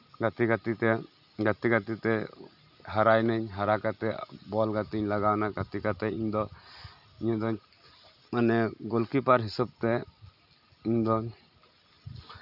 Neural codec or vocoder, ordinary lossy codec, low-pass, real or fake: none; none; 5.4 kHz; real